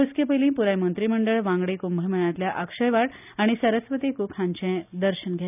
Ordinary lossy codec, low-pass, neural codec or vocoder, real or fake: none; 3.6 kHz; none; real